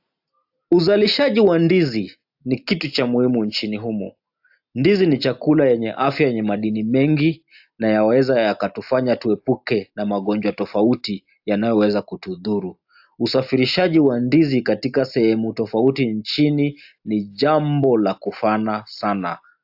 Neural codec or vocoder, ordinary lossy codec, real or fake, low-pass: none; AAC, 48 kbps; real; 5.4 kHz